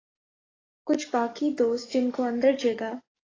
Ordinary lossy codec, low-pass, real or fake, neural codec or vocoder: AAC, 32 kbps; 7.2 kHz; fake; codec, 44.1 kHz, 7.8 kbps, DAC